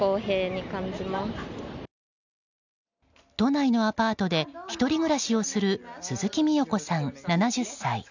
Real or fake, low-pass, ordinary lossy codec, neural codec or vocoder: real; 7.2 kHz; none; none